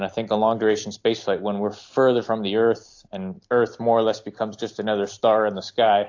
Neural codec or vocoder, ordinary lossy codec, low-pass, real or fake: none; AAC, 48 kbps; 7.2 kHz; real